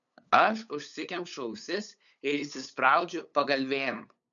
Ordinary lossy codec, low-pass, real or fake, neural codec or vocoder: MP3, 96 kbps; 7.2 kHz; fake; codec, 16 kHz, 8 kbps, FunCodec, trained on LibriTTS, 25 frames a second